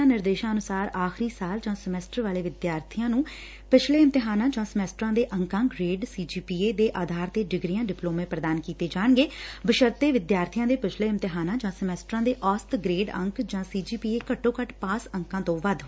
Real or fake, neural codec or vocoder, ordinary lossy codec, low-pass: real; none; none; none